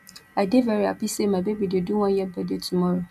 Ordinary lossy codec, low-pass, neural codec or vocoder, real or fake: none; 14.4 kHz; none; real